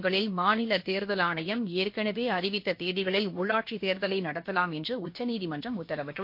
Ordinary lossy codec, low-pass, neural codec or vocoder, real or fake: MP3, 32 kbps; 5.4 kHz; codec, 16 kHz, about 1 kbps, DyCAST, with the encoder's durations; fake